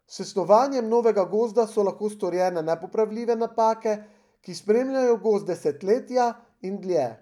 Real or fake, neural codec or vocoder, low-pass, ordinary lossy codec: real; none; 19.8 kHz; none